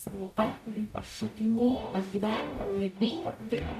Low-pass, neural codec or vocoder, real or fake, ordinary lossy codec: 14.4 kHz; codec, 44.1 kHz, 0.9 kbps, DAC; fake; AAC, 96 kbps